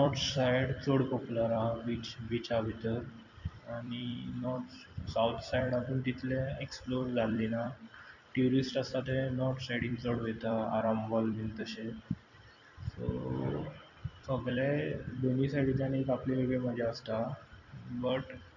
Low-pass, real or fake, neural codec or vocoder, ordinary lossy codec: 7.2 kHz; fake; vocoder, 44.1 kHz, 128 mel bands every 256 samples, BigVGAN v2; none